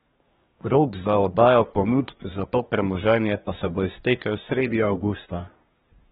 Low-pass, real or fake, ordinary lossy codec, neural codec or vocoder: 10.8 kHz; fake; AAC, 16 kbps; codec, 24 kHz, 1 kbps, SNAC